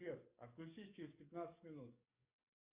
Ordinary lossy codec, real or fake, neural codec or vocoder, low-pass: Opus, 64 kbps; fake; codec, 44.1 kHz, 7.8 kbps, DAC; 3.6 kHz